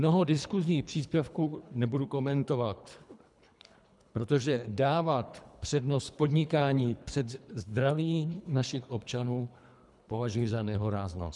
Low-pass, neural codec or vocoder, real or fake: 10.8 kHz; codec, 24 kHz, 3 kbps, HILCodec; fake